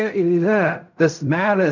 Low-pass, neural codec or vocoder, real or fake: 7.2 kHz; codec, 16 kHz in and 24 kHz out, 0.4 kbps, LongCat-Audio-Codec, fine tuned four codebook decoder; fake